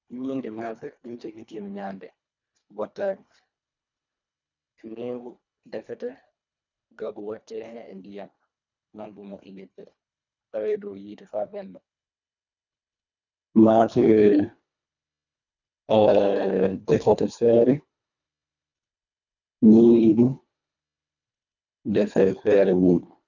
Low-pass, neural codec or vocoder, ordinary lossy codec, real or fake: 7.2 kHz; codec, 24 kHz, 1.5 kbps, HILCodec; none; fake